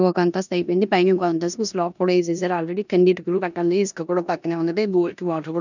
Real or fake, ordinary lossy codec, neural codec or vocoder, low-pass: fake; none; codec, 16 kHz in and 24 kHz out, 0.9 kbps, LongCat-Audio-Codec, four codebook decoder; 7.2 kHz